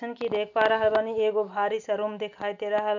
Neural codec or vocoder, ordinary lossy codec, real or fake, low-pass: none; none; real; 7.2 kHz